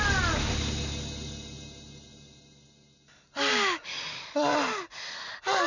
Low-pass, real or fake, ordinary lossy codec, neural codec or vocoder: 7.2 kHz; real; none; none